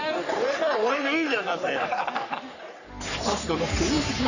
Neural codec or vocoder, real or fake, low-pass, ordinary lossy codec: codec, 44.1 kHz, 3.4 kbps, Pupu-Codec; fake; 7.2 kHz; none